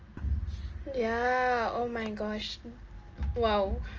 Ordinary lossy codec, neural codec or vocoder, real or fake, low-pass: Opus, 24 kbps; none; real; 7.2 kHz